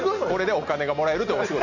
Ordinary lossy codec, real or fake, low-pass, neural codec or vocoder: none; real; 7.2 kHz; none